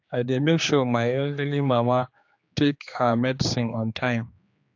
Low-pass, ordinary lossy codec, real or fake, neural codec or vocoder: 7.2 kHz; AAC, 48 kbps; fake; codec, 16 kHz, 2 kbps, X-Codec, HuBERT features, trained on general audio